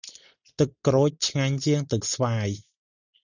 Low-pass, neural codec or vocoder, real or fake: 7.2 kHz; none; real